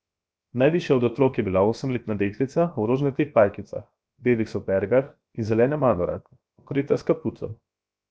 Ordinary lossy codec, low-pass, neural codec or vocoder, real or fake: none; none; codec, 16 kHz, 0.7 kbps, FocalCodec; fake